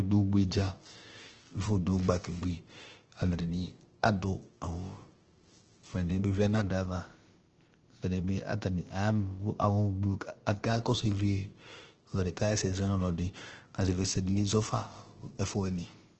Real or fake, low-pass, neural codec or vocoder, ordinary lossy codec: fake; 7.2 kHz; codec, 16 kHz, about 1 kbps, DyCAST, with the encoder's durations; Opus, 16 kbps